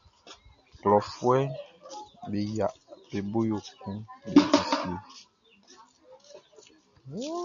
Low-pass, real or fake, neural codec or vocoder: 7.2 kHz; real; none